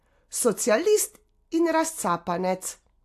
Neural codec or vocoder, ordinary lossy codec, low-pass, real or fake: none; AAC, 64 kbps; 14.4 kHz; real